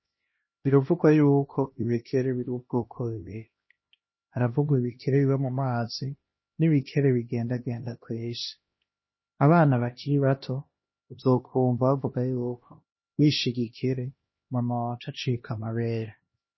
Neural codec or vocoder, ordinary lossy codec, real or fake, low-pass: codec, 16 kHz, 1 kbps, X-Codec, HuBERT features, trained on LibriSpeech; MP3, 24 kbps; fake; 7.2 kHz